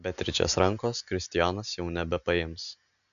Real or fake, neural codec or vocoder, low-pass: real; none; 7.2 kHz